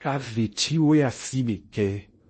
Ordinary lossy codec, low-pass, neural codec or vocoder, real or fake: MP3, 32 kbps; 10.8 kHz; codec, 16 kHz in and 24 kHz out, 0.6 kbps, FocalCodec, streaming, 2048 codes; fake